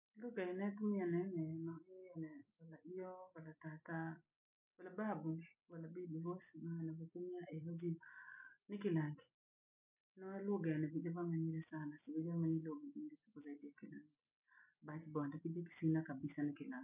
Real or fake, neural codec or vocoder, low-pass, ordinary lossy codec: real; none; 3.6 kHz; none